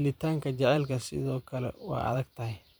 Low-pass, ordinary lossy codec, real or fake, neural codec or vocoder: none; none; fake; vocoder, 44.1 kHz, 128 mel bands every 512 samples, BigVGAN v2